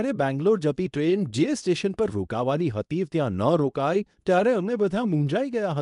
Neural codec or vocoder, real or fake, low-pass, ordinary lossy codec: codec, 24 kHz, 0.9 kbps, WavTokenizer, medium speech release version 2; fake; 10.8 kHz; none